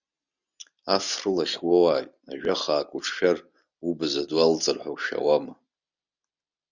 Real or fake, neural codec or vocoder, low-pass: real; none; 7.2 kHz